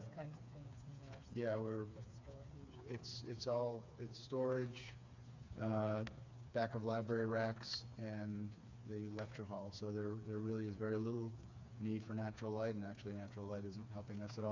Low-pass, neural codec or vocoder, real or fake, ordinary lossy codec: 7.2 kHz; codec, 16 kHz, 4 kbps, FreqCodec, smaller model; fake; MP3, 64 kbps